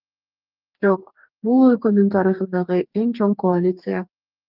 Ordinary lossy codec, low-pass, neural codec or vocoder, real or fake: Opus, 24 kbps; 5.4 kHz; codec, 44.1 kHz, 2.6 kbps, DAC; fake